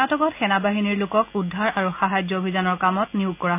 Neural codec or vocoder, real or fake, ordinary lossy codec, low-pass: none; real; none; 3.6 kHz